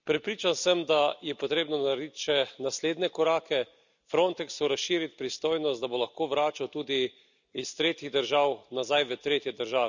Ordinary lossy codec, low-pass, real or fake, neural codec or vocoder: none; 7.2 kHz; real; none